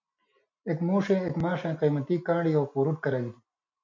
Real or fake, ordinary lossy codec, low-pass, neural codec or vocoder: real; MP3, 48 kbps; 7.2 kHz; none